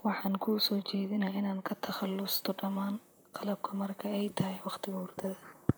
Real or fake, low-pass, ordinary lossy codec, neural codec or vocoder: fake; none; none; vocoder, 44.1 kHz, 128 mel bands, Pupu-Vocoder